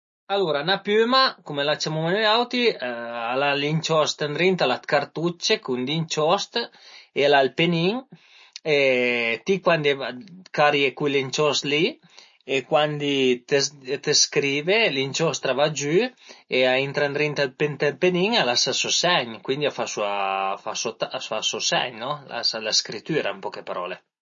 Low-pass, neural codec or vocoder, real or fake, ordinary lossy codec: 7.2 kHz; none; real; MP3, 32 kbps